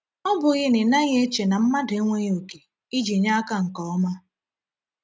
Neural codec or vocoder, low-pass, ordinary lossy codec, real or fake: none; none; none; real